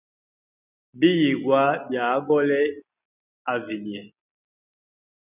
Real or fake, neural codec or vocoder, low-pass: real; none; 3.6 kHz